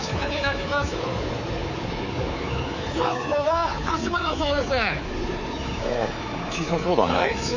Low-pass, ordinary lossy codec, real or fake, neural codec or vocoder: 7.2 kHz; none; fake; codec, 24 kHz, 3.1 kbps, DualCodec